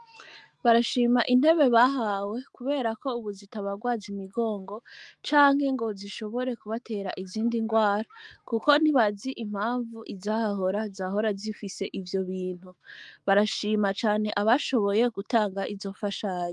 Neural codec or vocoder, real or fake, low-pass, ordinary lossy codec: autoencoder, 48 kHz, 128 numbers a frame, DAC-VAE, trained on Japanese speech; fake; 10.8 kHz; Opus, 32 kbps